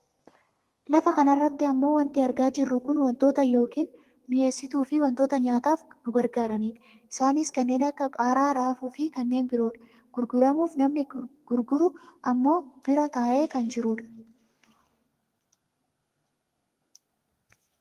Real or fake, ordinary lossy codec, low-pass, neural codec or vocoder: fake; Opus, 24 kbps; 14.4 kHz; codec, 32 kHz, 1.9 kbps, SNAC